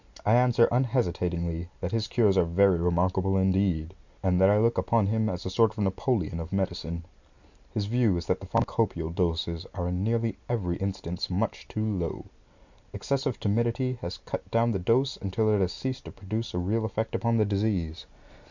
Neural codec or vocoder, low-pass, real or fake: none; 7.2 kHz; real